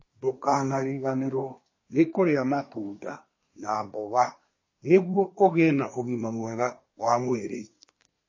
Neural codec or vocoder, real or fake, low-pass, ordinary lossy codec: codec, 24 kHz, 1 kbps, SNAC; fake; 7.2 kHz; MP3, 32 kbps